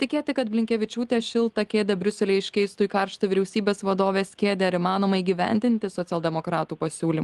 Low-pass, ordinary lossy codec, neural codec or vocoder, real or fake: 10.8 kHz; Opus, 24 kbps; none; real